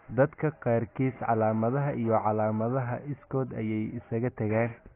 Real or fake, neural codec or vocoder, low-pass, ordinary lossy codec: real; none; 3.6 kHz; AAC, 16 kbps